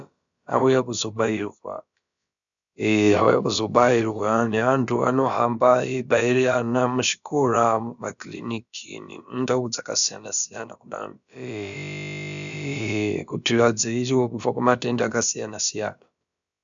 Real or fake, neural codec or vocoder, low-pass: fake; codec, 16 kHz, about 1 kbps, DyCAST, with the encoder's durations; 7.2 kHz